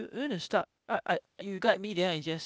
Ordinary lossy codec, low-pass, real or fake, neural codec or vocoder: none; none; fake; codec, 16 kHz, 0.8 kbps, ZipCodec